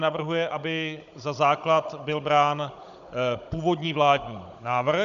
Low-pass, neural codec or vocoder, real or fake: 7.2 kHz; codec, 16 kHz, 16 kbps, FunCodec, trained on Chinese and English, 50 frames a second; fake